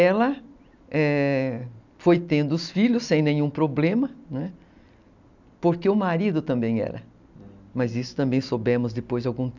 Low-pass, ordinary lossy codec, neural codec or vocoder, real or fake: 7.2 kHz; none; none; real